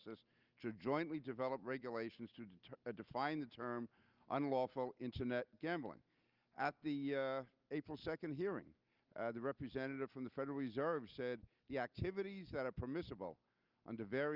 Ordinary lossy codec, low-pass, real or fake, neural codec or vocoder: Opus, 24 kbps; 5.4 kHz; real; none